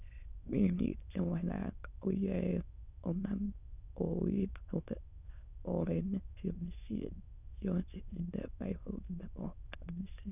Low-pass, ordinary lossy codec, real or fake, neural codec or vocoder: 3.6 kHz; none; fake; autoencoder, 22.05 kHz, a latent of 192 numbers a frame, VITS, trained on many speakers